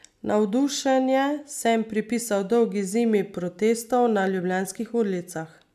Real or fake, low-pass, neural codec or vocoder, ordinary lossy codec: real; 14.4 kHz; none; none